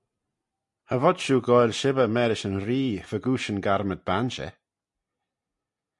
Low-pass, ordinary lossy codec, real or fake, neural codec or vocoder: 10.8 kHz; MP3, 64 kbps; real; none